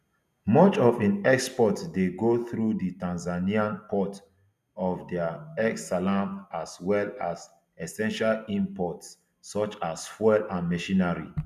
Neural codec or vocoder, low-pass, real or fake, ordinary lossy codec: none; 14.4 kHz; real; none